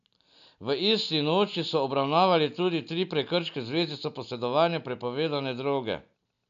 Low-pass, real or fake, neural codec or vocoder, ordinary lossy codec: 7.2 kHz; real; none; none